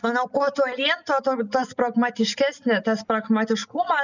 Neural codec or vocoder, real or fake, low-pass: none; real; 7.2 kHz